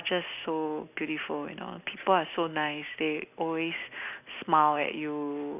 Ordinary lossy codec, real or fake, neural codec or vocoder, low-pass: none; real; none; 3.6 kHz